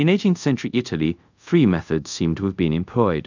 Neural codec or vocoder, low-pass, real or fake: codec, 24 kHz, 0.5 kbps, DualCodec; 7.2 kHz; fake